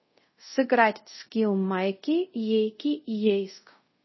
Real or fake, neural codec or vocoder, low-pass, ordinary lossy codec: fake; codec, 24 kHz, 0.5 kbps, DualCodec; 7.2 kHz; MP3, 24 kbps